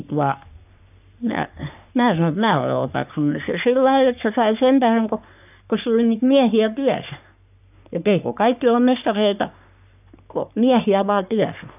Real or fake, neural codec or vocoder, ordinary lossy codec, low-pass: fake; codec, 44.1 kHz, 3.4 kbps, Pupu-Codec; none; 3.6 kHz